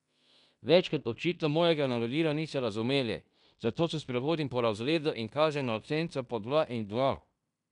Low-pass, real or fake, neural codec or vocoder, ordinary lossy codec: 10.8 kHz; fake; codec, 16 kHz in and 24 kHz out, 0.9 kbps, LongCat-Audio-Codec, fine tuned four codebook decoder; none